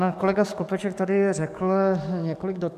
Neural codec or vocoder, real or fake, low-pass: codec, 44.1 kHz, 7.8 kbps, DAC; fake; 14.4 kHz